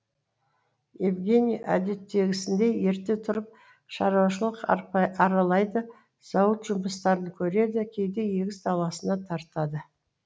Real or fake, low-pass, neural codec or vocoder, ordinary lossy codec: real; none; none; none